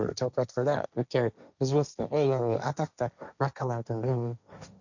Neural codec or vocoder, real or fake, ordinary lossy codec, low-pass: codec, 16 kHz, 1.1 kbps, Voila-Tokenizer; fake; none; 7.2 kHz